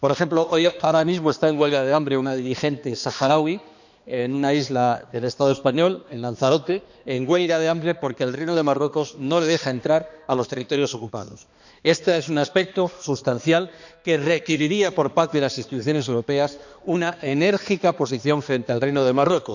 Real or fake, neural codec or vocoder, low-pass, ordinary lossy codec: fake; codec, 16 kHz, 2 kbps, X-Codec, HuBERT features, trained on balanced general audio; 7.2 kHz; none